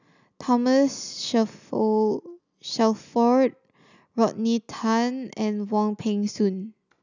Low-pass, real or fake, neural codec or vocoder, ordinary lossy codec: 7.2 kHz; real; none; none